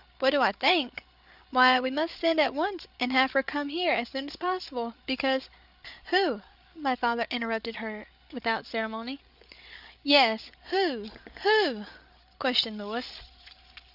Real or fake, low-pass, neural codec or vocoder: fake; 5.4 kHz; codec, 16 kHz, 8 kbps, FreqCodec, larger model